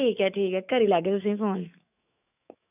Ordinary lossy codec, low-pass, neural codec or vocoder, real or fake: none; 3.6 kHz; autoencoder, 48 kHz, 128 numbers a frame, DAC-VAE, trained on Japanese speech; fake